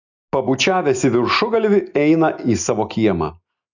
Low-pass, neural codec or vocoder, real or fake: 7.2 kHz; none; real